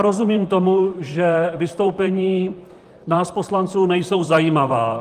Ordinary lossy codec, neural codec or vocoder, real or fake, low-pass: Opus, 32 kbps; vocoder, 44.1 kHz, 128 mel bands, Pupu-Vocoder; fake; 14.4 kHz